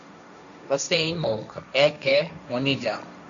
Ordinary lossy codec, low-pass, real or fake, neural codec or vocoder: MP3, 96 kbps; 7.2 kHz; fake; codec, 16 kHz, 1.1 kbps, Voila-Tokenizer